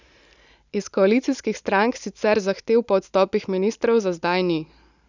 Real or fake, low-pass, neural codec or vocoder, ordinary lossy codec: real; 7.2 kHz; none; none